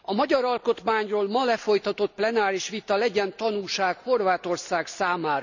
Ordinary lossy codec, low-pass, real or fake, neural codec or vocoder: none; 7.2 kHz; real; none